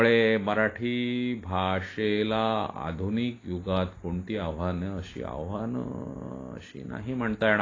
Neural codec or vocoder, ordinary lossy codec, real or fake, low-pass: none; AAC, 32 kbps; real; 7.2 kHz